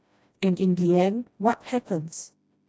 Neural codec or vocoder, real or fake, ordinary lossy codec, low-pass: codec, 16 kHz, 1 kbps, FreqCodec, smaller model; fake; none; none